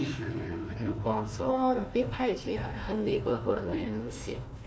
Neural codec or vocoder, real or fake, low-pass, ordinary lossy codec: codec, 16 kHz, 1 kbps, FunCodec, trained on Chinese and English, 50 frames a second; fake; none; none